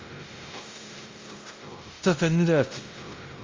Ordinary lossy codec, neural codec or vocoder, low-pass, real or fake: Opus, 32 kbps; codec, 16 kHz, 0.5 kbps, X-Codec, WavLM features, trained on Multilingual LibriSpeech; 7.2 kHz; fake